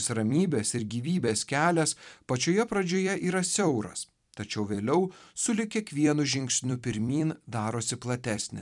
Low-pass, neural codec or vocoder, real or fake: 10.8 kHz; vocoder, 44.1 kHz, 128 mel bands every 256 samples, BigVGAN v2; fake